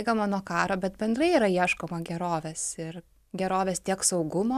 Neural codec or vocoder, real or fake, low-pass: none; real; 14.4 kHz